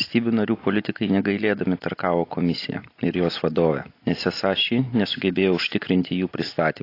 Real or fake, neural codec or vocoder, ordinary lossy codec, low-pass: real; none; AAC, 32 kbps; 5.4 kHz